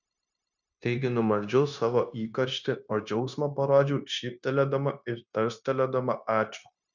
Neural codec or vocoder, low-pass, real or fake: codec, 16 kHz, 0.9 kbps, LongCat-Audio-Codec; 7.2 kHz; fake